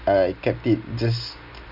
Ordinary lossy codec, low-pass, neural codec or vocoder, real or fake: none; 5.4 kHz; none; real